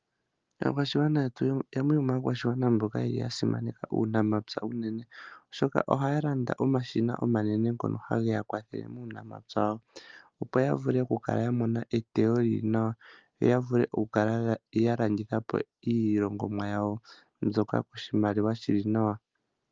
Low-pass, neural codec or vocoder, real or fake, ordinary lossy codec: 7.2 kHz; none; real; Opus, 24 kbps